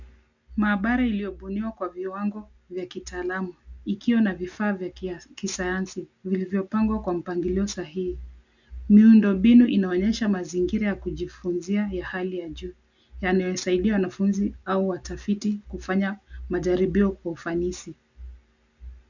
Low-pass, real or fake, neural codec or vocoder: 7.2 kHz; real; none